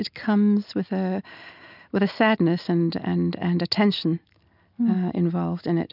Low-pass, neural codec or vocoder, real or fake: 5.4 kHz; none; real